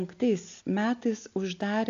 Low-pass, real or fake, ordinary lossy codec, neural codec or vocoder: 7.2 kHz; real; AAC, 64 kbps; none